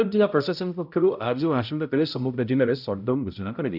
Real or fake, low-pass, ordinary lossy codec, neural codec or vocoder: fake; 5.4 kHz; none; codec, 16 kHz, 0.5 kbps, X-Codec, HuBERT features, trained on balanced general audio